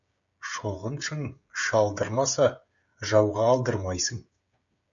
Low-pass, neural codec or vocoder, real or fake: 7.2 kHz; codec, 16 kHz, 16 kbps, FreqCodec, smaller model; fake